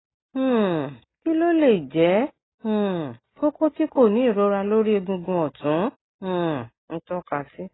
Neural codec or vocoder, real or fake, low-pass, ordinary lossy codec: none; real; 7.2 kHz; AAC, 16 kbps